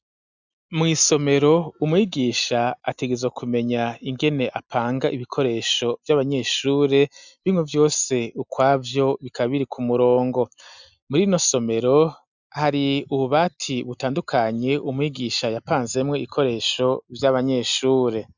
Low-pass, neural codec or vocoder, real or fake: 7.2 kHz; none; real